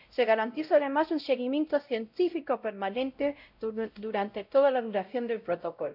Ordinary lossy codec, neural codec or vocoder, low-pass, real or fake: none; codec, 16 kHz, 0.5 kbps, X-Codec, WavLM features, trained on Multilingual LibriSpeech; 5.4 kHz; fake